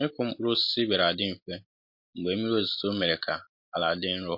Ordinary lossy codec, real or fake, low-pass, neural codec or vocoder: MP3, 32 kbps; real; 5.4 kHz; none